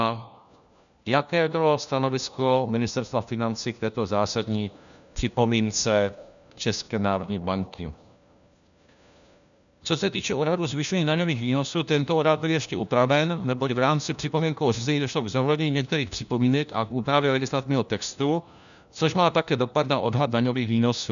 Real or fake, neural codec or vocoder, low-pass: fake; codec, 16 kHz, 1 kbps, FunCodec, trained on LibriTTS, 50 frames a second; 7.2 kHz